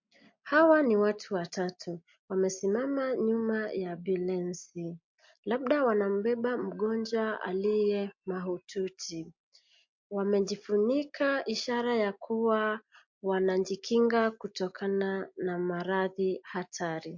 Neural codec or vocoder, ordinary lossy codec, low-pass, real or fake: none; MP3, 48 kbps; 7.2 kHz; real